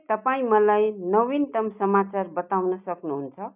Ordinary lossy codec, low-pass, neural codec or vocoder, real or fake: none; 3.6 kHz; none; real